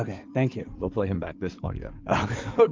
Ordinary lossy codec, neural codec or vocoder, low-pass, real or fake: Opus, 24 kbps; codec, 16 kHz in and 24 kHz out, 2.2 kbps, FireRedTTS-2 codec; 7.2 kHz; fake